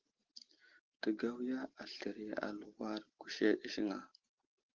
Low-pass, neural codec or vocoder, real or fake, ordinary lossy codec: 7.2 kHz; none; real; Opus, 16 kbps